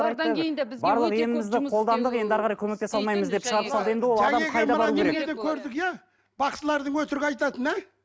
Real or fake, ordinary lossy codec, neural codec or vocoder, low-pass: real; none; none; none